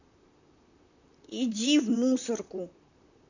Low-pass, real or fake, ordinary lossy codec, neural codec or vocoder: 7.2 kHz; fake; none; vocoder, 44.1 kHz, 128 mel bands, Pupu-Vocoder